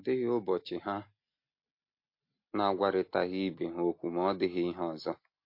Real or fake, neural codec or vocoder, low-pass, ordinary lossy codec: real; none; 5.4 kHz; MP3, 32 kbps